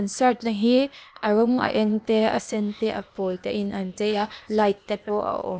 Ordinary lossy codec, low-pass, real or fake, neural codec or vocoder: none; none; fake; codec, 16 kHz, 0.8 kbps, ZipCodec